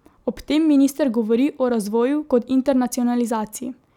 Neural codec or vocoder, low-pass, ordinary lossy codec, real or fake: none; 19.8 kHz; none; real